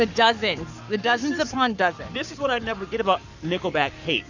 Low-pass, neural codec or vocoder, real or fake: 7.2 kHz; codec, 44.1 kHz, 7.8 kbps, Pupu-Codec; fake